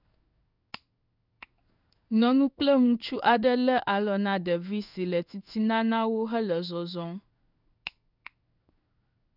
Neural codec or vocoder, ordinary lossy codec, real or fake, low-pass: codec, 16 kHz in and 24 kHz out, 1 kbps, XY-Tokenizer; none; fake; 5.4 kHz